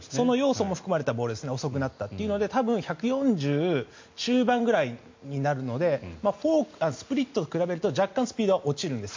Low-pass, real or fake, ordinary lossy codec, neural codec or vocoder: 7.2 kHz; real; MP3, 48 kbps; none